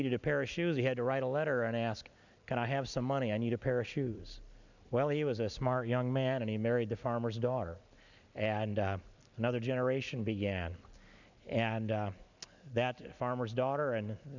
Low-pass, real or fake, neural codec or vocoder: 7.2 kHz; real; none